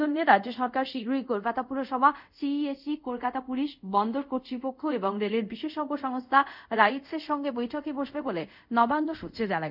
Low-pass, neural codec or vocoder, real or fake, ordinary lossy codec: 5.4 kHz; codec, 24 kHz, 0.5 kbps, DualCodec; fake; none